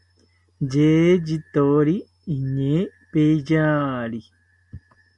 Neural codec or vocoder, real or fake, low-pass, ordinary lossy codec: none; real; 10.8 kHz; MP3, 96 kbps